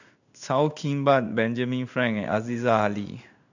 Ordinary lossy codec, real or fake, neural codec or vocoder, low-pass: none; fake; codec, 16 kHz in and 24 kHz out, 1 kbps, XY-Tokenizer; 7.2 kHz